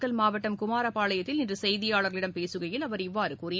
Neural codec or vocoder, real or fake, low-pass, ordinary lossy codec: none; real; none; none